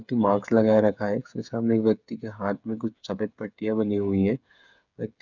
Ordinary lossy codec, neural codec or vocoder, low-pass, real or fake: none; codec, 16 kHz, 8 kbps, FreqCodec, smaller model; 7.2 kHz; fake